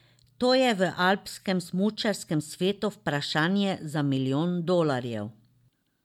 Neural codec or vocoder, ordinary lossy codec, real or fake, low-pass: none; MP3, 96 kbps; real; 19.8 kHz